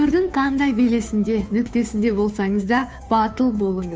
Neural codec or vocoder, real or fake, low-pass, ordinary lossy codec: codec, 16 kHz, 2 kbps, FunCodec, trained on Chinese and English, 25 frames a second; fake; none; none